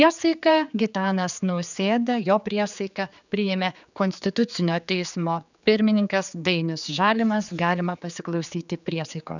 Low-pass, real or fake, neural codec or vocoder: 7.2 kHz; fake; codec, 16 kHz, 4 kbps, X-Codec, HuBERT features, trained on general audio